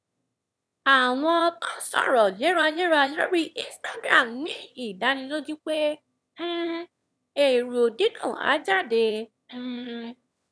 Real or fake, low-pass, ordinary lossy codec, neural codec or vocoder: fake; none; none; autoencoder, 22.05 kHz, a latent of 192 numbers a frame, VITS, trained on one speaker